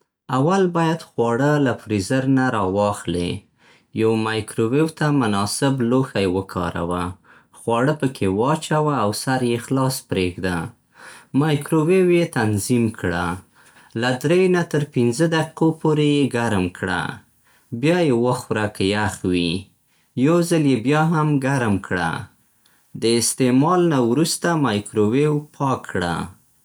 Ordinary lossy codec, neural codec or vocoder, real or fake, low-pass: none; none; real; none